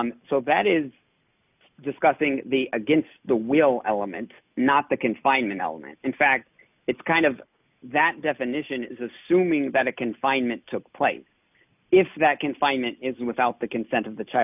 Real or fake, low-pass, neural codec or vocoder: real; 3.6 kHz; none